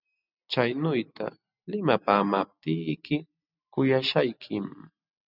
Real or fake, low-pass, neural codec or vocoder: real; 5.4 kHz; none